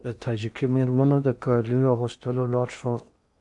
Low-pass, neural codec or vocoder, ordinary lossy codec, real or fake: 10.8 kHz; codec, 16 kHz in and 24 kHz out, 0.8 kbps, FocalCodec, streaming, 65536 codes; MP3, 64 kbps; fake